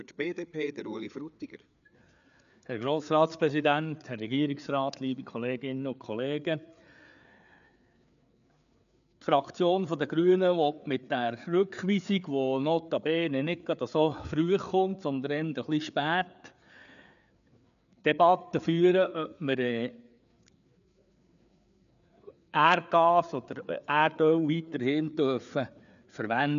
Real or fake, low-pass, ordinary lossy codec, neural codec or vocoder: fake; 7.2 kHz; none; codec, 16 kHz, 4 kbps, FreqCodec, larger model